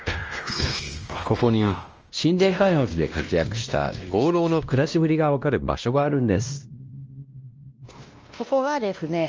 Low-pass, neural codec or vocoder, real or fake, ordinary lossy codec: 7.2 kHz; codec, 16 kHz, 1 kbps, X-Codec, WavLM features, trained on Multilingual LibriSpeech; fake; Opus, 24 kbps